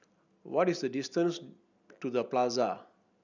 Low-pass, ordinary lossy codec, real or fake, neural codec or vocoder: 7.2 kHz; none; real; none